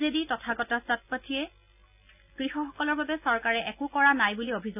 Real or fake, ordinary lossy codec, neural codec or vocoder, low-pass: real; none; none; 3.6 kHz